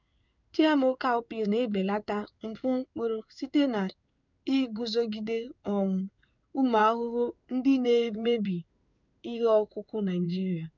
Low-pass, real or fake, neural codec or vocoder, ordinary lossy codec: 7.2 kHz; fake; codec, 16 kHz in and 24 kHz out, 1 kbps, XY-Tokenizer; none